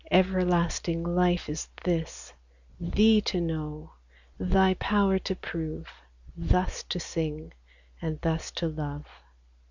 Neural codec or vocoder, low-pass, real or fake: none; 7.2 kHz; real